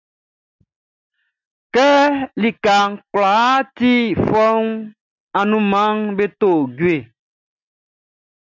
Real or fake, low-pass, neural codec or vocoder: real; 7.2 kHz; none